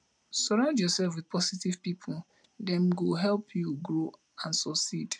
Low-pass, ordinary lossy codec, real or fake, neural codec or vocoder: none; none; real; none